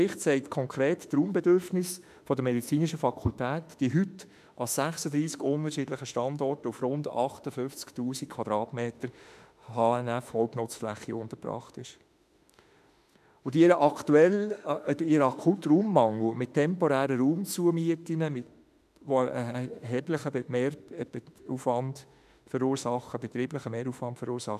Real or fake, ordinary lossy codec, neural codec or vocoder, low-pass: fake; none; autoencoder, 48 kHz, 32 numbers a frame, DAC-VAE, trained on Japanese speech; 14.4 kHz